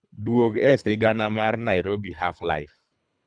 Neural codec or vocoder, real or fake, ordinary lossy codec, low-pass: codec, 24 kHz, 3 kbps, HILCodec; fake; none; 9.9 kHz